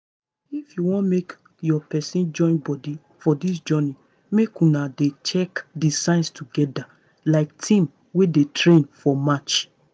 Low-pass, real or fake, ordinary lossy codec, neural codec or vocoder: none; real; none; none